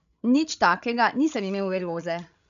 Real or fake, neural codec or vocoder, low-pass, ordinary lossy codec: fake; codec, 16 kHz, 16 kbps, FreqCodec, larger model; 7.2 kHz; none